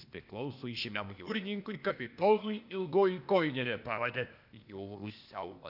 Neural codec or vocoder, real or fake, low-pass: codec, 16 kHz, 0.8 kbps, ZipCodec; fake; 5.4 kHz